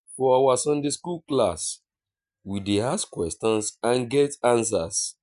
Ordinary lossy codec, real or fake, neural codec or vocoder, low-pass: none; real; none; 10.8 kHz